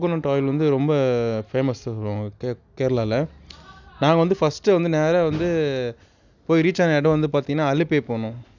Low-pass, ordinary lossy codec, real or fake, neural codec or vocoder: 7.2 kHz; none; real; none